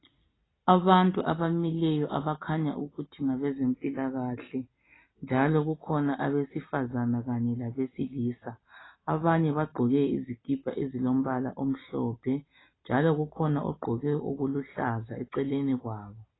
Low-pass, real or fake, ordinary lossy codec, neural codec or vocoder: 7.2 kHz; real; AAC, 16 kbps; none